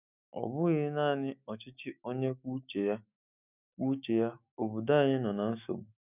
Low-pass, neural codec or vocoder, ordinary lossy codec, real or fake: 3.6 kHz; autoencoder, 48 kHz, 128 numbers a frame, DAC-VAE, trained on Japanese speech; none; fake